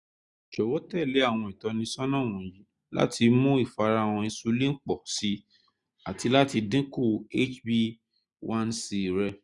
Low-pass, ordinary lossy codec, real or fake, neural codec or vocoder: 10.8 kHz; Opus, 64 kbps; real; none